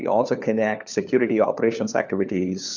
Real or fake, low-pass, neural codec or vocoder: fake; 7.2 kHz; codec, 16 kHz, 4 kbps, FunCodec, trained on LibriTTS, 50 frames a second